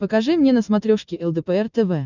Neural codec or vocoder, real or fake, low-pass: none; real; 7.2 kHz